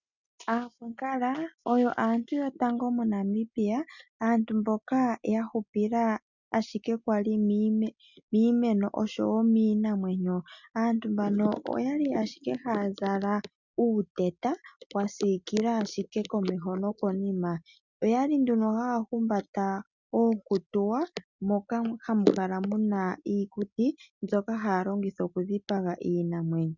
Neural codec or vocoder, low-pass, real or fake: none; 7.2 kHz; real